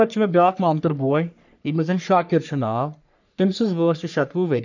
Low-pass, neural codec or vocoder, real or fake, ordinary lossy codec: 7.2 kHz; codec, 44.1 kHz, 3.4 kbps, Pupu-Codec; fake; none